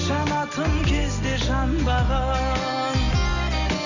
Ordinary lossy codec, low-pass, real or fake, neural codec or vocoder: none; 7.2 kHz; real; none